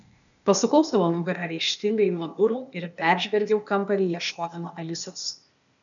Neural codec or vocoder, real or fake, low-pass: codec, 16 kHz, 0.8 kbps, ZipCodec; fake; 7.2 kHz